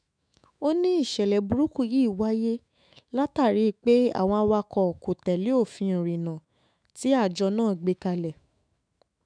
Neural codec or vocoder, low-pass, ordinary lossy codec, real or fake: autoencoder, 48 kHz, 128 numbers a frame, DAC-VAE, trained on Japanese speech; 9.9 kHz; none; fake